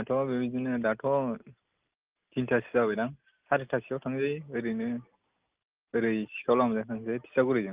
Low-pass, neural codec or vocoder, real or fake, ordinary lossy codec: 3.6 kHz; none; real; Opus, 32 kbps